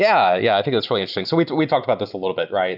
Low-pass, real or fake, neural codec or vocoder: 5.4 kHz; real; none